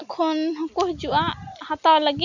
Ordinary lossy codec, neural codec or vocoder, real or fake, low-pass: none; none; real; 7.2 kHz